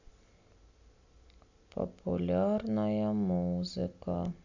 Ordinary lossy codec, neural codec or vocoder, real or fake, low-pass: AAC, 48 kbps; none; real; 7.2 kHz